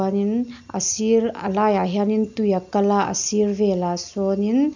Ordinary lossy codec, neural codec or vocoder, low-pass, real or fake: none; none; 7.2 kHz; real